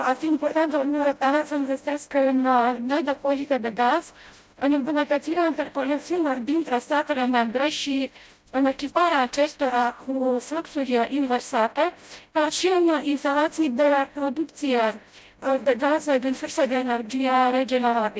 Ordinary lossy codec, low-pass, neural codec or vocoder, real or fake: none; none; codec, 16 kHz, 0.5 kbps, FreqCodec, smaller model; fake